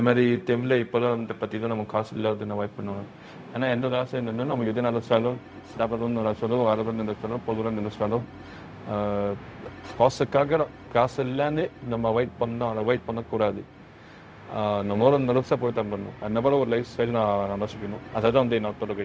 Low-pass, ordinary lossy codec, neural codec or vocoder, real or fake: none; none; codec, 16 kHz, 0.4 kbps, LongCat-Audio-Codec; fake